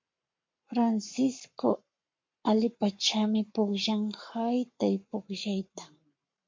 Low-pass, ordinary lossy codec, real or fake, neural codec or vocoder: 7.2 kHz; MP3, 48 kbps; fake; codec, 44.1 kHz, 7.8 kbps, Pupu-Codec